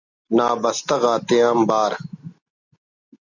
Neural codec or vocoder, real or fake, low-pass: none; real; 7.2 kHz